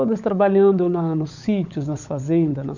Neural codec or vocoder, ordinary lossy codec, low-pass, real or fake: codec, 16 kHz, 16 kbps, FunCodec, trained on LibriTTS, 50 frames a second; none; 7.2 kHz; fake